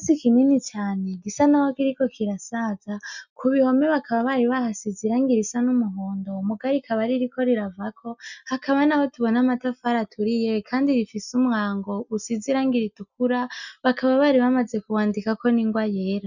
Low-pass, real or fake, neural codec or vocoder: 7.2 kHz; real; none